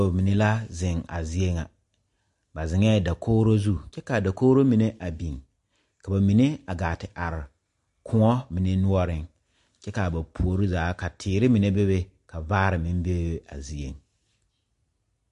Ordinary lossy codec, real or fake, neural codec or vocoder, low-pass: MP3, 48 kbps; real; none; 14.4 kHz